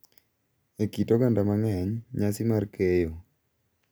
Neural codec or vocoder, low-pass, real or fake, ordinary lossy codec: none; none; real; none